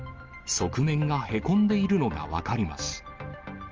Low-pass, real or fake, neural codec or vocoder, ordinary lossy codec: 7.2 kHz; real; none; Opus, 24 kbps